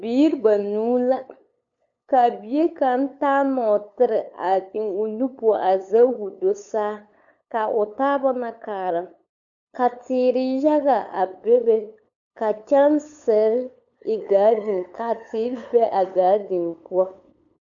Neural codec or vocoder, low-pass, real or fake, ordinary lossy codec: codec, 16 kHz, 8 kbps, FunCodec, trained on LibriTTS, 25 frames a second; 7.2 kHz; fake; MP3, 96 kbps